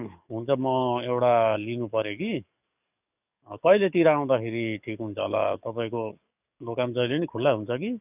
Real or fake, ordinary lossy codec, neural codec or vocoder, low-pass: fake; none; codec, 44.1 kHz, 7.8 kbps, DAC; 3.6 kHz